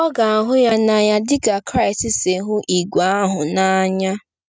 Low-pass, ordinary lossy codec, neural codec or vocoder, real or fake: none; none; none; real